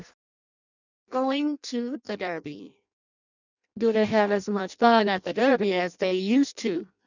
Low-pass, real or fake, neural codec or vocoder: 7.2 kHz; fake; codec, 16 kHz in and 24 kHz out, 0.6 kbps, FireRedTTS-2 codec